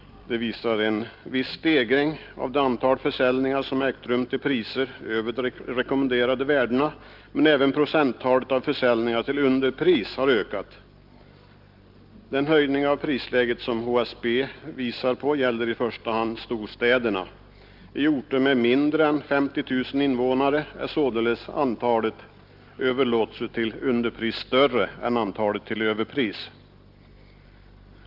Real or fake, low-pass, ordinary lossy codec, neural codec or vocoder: real; 5.4 kHz; Opus, 32 kbps; none